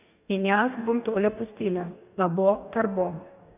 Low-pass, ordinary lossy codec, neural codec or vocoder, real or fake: 3.6 kHz; none; codec, 44.1 kHz, 2.6 kbps, DAC; fake